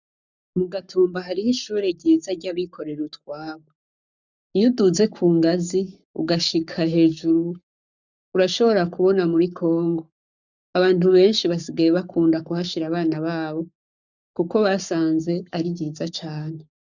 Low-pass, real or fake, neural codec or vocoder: 7.2 kHz; fake; codec, 44.1 kHz, 7.8 kbps, Pupu-Codec